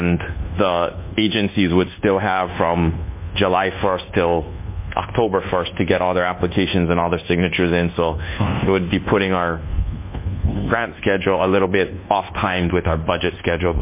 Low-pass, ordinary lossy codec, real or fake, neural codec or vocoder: 3.6 kHz; MP3, 24 kbps; fake; codec, 24 kHz, 1.2 kbps, DualCodec